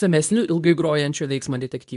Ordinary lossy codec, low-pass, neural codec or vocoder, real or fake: AAC, 96 kbps; 10.8 kHz; codec, 24 kHz, 0.9 kbps, WavTokenizer, medium speech release version 2; fake